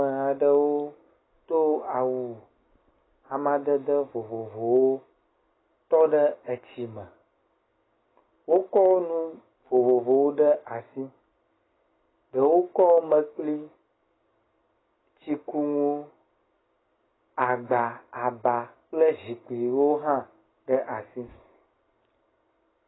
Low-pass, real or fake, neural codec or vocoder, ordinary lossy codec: 7.2 kHz; real; none; AAC, 16 kbps